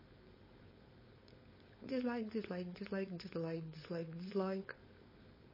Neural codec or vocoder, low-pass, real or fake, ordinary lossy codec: codec, 16 kHz, 4.8 kbps, FACodec; 5.4 kHz; fake; MP3, 24 kbps